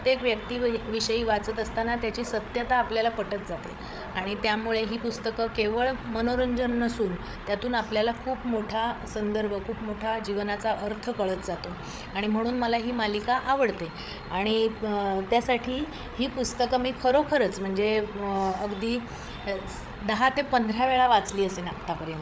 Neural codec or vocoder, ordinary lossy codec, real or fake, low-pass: codec, 16 kHz, 8 kbps, FreqCodec, larger model; none; fake; none